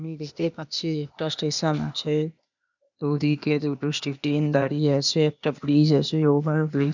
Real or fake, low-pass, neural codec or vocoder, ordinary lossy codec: fake; 7.2 kHz; codec, 16 kHz, 0.8 kbps, ZipCodec; none